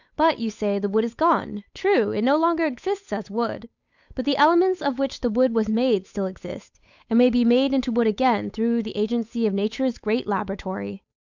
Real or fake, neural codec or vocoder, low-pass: fake; codec, 16 kHz, 8 kbps, FunCodec, trained on Chinese and English, 25 frames a second; 7.2 kHz